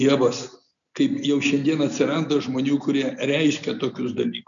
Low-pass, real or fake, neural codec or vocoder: 7.2 kHz; real; none